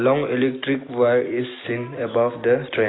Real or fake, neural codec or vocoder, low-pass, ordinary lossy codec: real; none; 7.2 kHz; AAC, 16 kbps